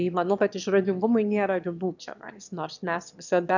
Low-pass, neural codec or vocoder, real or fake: 7.2 kHz; autoencoder, 22.05 kHz, a latent of 192 numbers a frame, VITS, trained on one speaker; fake